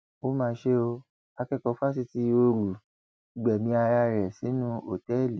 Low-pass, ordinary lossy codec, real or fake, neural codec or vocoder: none; none; real; none